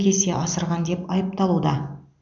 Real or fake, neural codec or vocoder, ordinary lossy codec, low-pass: real; none; none; 7.2 kHz